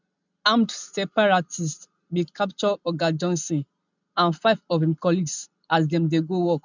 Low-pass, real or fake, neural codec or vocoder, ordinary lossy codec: 7.2 kHz; real; none; none